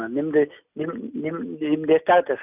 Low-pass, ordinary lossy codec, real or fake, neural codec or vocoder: 3.6 kHz; none; real; none